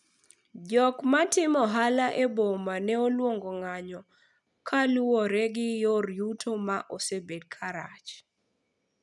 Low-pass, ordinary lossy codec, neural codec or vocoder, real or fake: 10.8 kHz; none; none; real